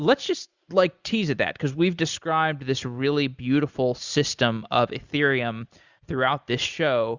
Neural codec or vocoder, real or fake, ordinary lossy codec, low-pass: none; real; Opus, 64 kbps; 7.2 kHz